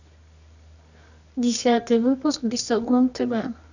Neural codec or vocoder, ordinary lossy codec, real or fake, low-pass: codec, 24 kHz, 0.9 kbps, WavTokenizer, medium music audio release; none; fake; 7.2 kHz